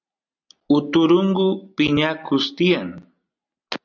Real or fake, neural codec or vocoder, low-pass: real; none; 7.2 kHz